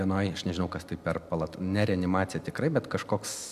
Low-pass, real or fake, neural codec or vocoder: 14.4 kHz; real; none